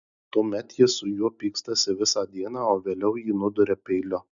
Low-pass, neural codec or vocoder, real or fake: 7.2 kHz; none; real